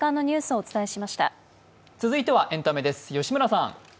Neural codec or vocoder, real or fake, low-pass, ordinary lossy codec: none; real; none; none